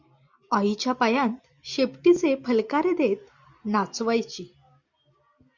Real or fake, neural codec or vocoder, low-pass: real; none; 7.2 kHz